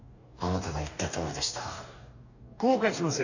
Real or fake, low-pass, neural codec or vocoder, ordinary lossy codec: fake; 7.2 kHz; codec, 44.1 kHz, 2.6 kbps, DAC; none